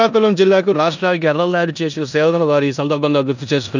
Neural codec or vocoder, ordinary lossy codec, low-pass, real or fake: codec, 16 kHz in and 24 kHz out, 0.9 kbps, LongCat-Audio-Codec, four codebook decoder; none; 7.2 kHz; fake